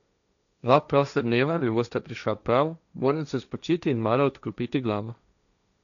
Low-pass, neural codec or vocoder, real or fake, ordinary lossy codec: 7.2 kHz; codec, 16 kHz, 1.1 kbps, Voila-Tokenizer; fake; none